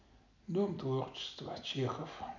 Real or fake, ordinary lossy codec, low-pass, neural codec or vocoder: real; none; 7.2 kHz; none